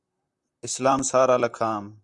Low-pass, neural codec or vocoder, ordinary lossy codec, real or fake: 10.8 kHz; vocoder, 44.1 kHz, 128 mel bands, Pupu-Vocoder; Opus, 64 kbps; fake